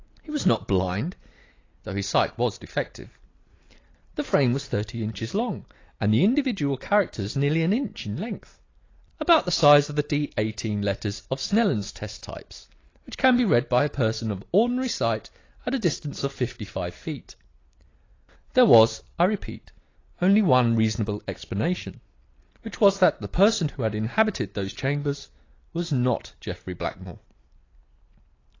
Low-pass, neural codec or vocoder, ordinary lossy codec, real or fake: 7.2 kHz; none; AAC, 32 kbps; real